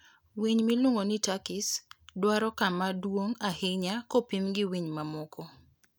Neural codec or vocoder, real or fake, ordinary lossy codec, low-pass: none; real; none; none